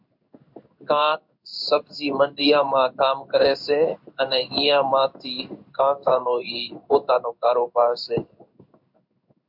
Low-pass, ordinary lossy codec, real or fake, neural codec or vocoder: 5.4 kHz; MP3, 48 kbps; fake; codec, 16 kHz in and 24 kHz out, 1 kbps, XY-Tokenizer